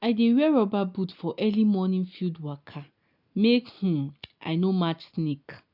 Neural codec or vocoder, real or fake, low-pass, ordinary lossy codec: none; real; 5.4 kHz; none